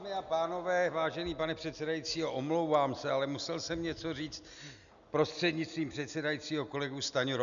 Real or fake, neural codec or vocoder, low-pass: real; none; 7.2 kHz